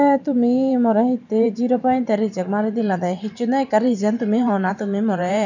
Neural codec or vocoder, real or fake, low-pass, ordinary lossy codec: vocoder, 44.1 kHz, 128 mel bands every 512 samples, BigVGAN v2; fake; 7.2 kHz; none